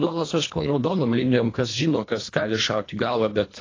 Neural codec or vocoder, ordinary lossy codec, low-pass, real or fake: codec, 24 kHz, 1.5 kbps, HILCodec; AAC, 32 kbps; 7.2 kHz; fake